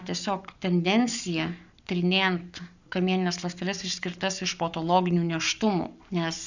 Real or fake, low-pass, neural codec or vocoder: fake; 7.2 kHz; codec, 44.1 kHz, 7.8 kbps, Pupu-Codec